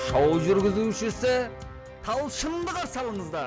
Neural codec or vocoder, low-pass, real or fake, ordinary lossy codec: none; none; real; none